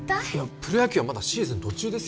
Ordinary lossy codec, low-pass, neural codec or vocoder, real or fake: none; none; none; real